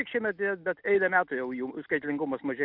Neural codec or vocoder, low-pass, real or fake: none; 5.4 kHz; real